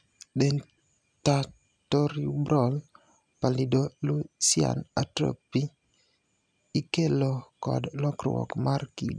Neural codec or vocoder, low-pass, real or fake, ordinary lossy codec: none; none; real; none